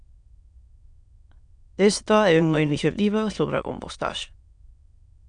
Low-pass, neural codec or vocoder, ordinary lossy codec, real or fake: 9.9 kHz; autoencoder, 22.05 kHz, a latent of 192 numbers a frame, VITS, trained on many speakers; MP3, 96 kbps; fake